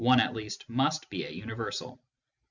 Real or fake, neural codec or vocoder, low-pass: real; none; 7.2 kHz